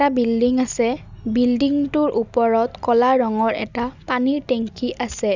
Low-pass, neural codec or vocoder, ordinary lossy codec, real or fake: 7.2 kHz; none; none; real